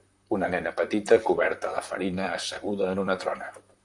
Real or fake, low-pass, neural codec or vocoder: fake; 10.8 kHz; vocoder, 44.1 kHz, 128 mel bands, Pupu-Vocoder